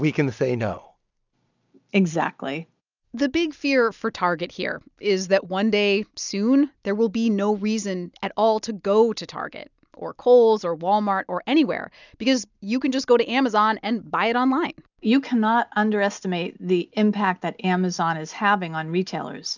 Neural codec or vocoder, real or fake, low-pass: none; real; 7.2 kHz